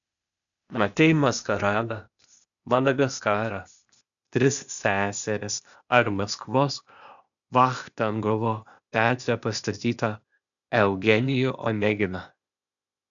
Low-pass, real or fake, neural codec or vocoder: 7.2 kHz; fake; codec, 16 kHz, 0.8 kbps, ZipCodec